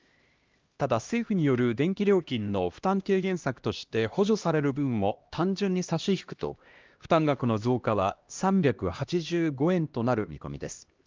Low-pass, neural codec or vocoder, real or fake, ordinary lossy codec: 7.2 kHz; codec, 16 kHz, 1 kbps, X-Codec, HuBERT features, trained on LibriSpeech; fake; Opus, 32 kbps